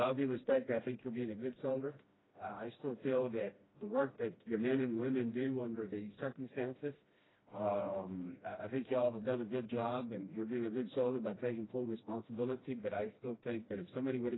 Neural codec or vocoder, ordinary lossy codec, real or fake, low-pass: codec, 16 kHz, 1 kbps, FreqCodec, smaller model; AAC, 16 kbps; fake; 7.2 kHz